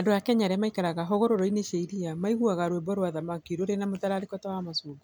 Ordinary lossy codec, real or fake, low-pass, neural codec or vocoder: none; real; none; none